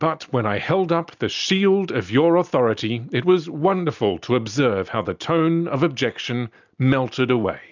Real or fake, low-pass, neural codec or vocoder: real; 7.2 kHz; none